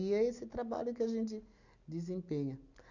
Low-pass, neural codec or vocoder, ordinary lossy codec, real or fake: 7.2 kHz; none; none; real